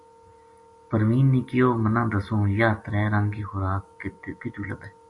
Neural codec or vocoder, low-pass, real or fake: none; 10.8 kHz; real